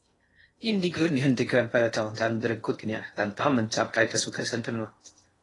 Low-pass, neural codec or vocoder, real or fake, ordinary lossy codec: 10.8 kHz; codec, 16 kHz in and 24 kHz out, 0.6 kbps, FocalCodec, streaming, 2048 codes; fake; AAC, 32 kbps